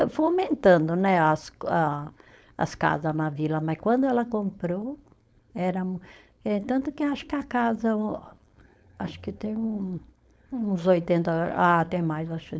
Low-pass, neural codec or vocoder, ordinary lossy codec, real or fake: none; codec, 16 kHz, 4.8 kbps, FACodec; none; fake